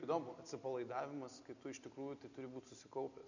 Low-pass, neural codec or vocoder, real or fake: 7.2 kHz; none; real